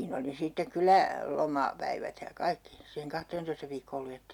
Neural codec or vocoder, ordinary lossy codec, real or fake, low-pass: none; none; real; 19.8 kHz